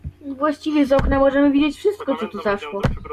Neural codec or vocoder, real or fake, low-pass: none; real; 14.4 kHz